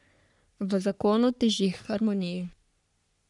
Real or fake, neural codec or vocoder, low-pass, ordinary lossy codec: fake; codec, 44.1 kHz, 3.4 kbps, Pupu-Codec; 10.8 kHz; none